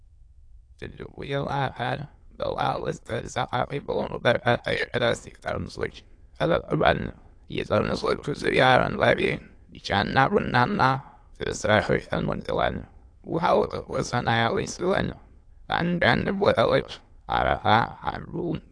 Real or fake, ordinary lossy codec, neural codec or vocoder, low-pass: fake; AAC, 48 kbps; autoencoder, 22.05 kHz, a latent of 192 numbers a frame, VITS, trained on many speakers; 9.9 kHz